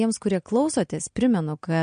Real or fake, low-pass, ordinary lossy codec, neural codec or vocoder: real; 9.9 kHz; MP3, 48 kbps; none